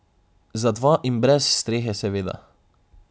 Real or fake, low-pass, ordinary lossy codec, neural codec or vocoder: real; none; none; none